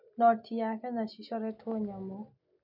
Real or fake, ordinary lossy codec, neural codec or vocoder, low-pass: real; MP3, 48 kbps; none; 5.4 kHz